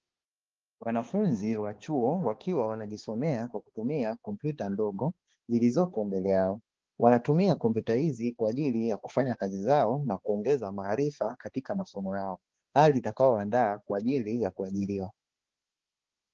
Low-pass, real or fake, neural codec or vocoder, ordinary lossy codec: 7.2 kHz; fake; codec, 16 kHz, 2 kbps, X-Codec, HuBERT features, trained on balanced general audio; Opus, 16 kbps